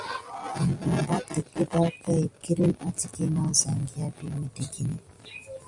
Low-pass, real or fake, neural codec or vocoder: 10.8 kHz; real; none